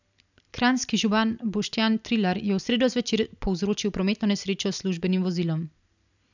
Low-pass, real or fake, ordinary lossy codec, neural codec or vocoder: 7.2 kHz; real; none; none